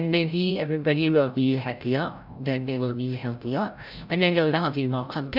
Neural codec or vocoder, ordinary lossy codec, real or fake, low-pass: codec, 16 kHz, 0.5 kbps, FreqCodec, larger model; none; fake; 5.4 kHz